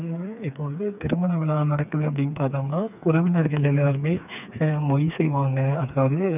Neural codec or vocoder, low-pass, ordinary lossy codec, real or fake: codec, 16 kHz, 4 kbps, FreqCodec, smaller model; 3.6 kHz; none; fake